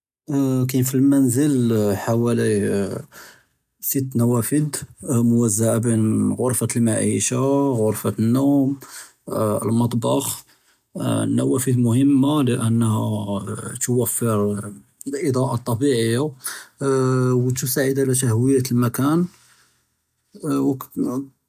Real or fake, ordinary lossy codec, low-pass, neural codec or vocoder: real; none; 14.4 kHz; none